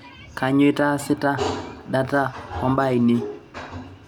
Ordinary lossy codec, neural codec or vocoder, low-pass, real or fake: none; none; 19.8 kHz; real